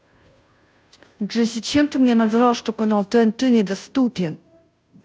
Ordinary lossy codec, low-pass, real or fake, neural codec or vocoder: none; none; fake; codec, 16 kHz, 0.5 kbps, FunCodec, trained on Chinese and English, 25 frames a second